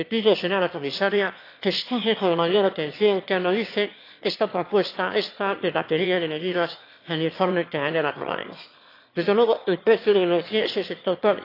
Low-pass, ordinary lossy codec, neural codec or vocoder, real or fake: 5.4 kHz; AAC, 32 kbps; autoencoder, 22.05 kHz, a latent of 192 numbers a frame, VITS, trained on one speaker; fake